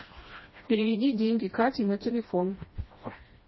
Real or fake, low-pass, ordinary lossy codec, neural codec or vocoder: fake; 7.2 kHz; MP3, 24 kbps; codec, 16 kHz, 1 kbps, FreqCodec, smaller model